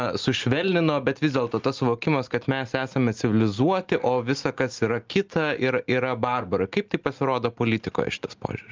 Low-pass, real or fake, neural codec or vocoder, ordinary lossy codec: 7.2 kHz; real; none; Opus, 24 kbps